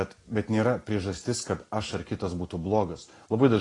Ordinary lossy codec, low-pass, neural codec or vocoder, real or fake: AAC, 32 kbps; 10.8 kHz; none; real